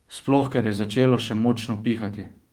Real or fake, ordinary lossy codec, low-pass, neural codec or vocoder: fake; Opus, 32 kbps; 19.8 kHz; autoencoder, 48 kHz, 32 numbers a frame, DAC-VAE, trained on Japanese speech